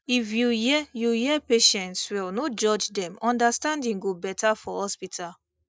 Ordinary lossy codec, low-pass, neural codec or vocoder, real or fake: none; none; none; real